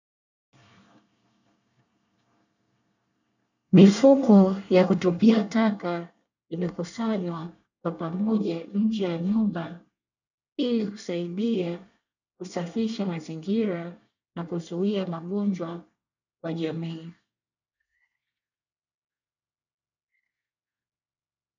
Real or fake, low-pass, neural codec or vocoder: fake; 7.2 kHz; codec, 24 kHz, 1 kbps, SNAC